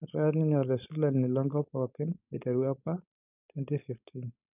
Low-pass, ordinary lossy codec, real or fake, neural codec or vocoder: 3.6 kHz; none; fake; codec, 16 kHz, 4.8 kbps, FACodec